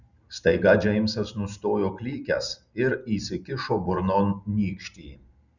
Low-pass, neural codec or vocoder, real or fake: 7.2 kHz; none; real